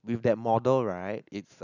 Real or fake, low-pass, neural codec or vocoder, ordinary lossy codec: real; 7.2 kHz; none; none